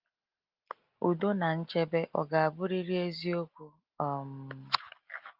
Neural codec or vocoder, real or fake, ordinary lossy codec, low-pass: none; real; Opus, 32 kbps; 5.4 kHz